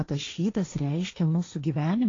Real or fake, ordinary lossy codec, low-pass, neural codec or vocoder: fake; AAC, 32 kbps; 7.2 kHz; codec, 16 kHz, 1.1 kbps, Voila-Tokenizer